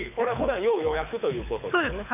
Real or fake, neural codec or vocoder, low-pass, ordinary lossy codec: fake; codec, 24 kHz, 6 kbps, HILCodec; 3.6 kHz; none